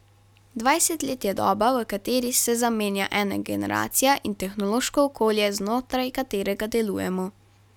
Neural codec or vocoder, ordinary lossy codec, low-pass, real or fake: none; none; 19.8 kHz; real